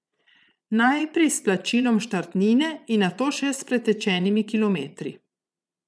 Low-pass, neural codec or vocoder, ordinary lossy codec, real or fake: none; vocoder, 22.05 kHz, 80 mel bands, Vocos; none; fake